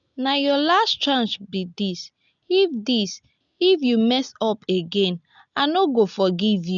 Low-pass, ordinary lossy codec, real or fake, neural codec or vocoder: 7.2 kHz; none; real; none